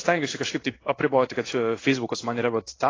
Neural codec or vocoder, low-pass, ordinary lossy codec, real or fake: codec, 16 kHz in and 24 kHz out, 1 kbps, XY-Tokenizer; 7.2 kHz; AAC, 32 kbps; fake